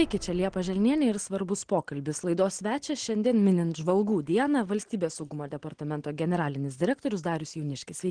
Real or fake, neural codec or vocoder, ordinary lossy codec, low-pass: real; none; Opus, 16 kbps; 9.9 kHz